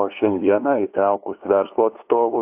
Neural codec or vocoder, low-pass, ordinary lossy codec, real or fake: codec, 16 kHz, 2 kbps, FunCodec, trained on LibriTTS, 25 frames a second; 3.6 kHz; AAC, 32 kbps; fake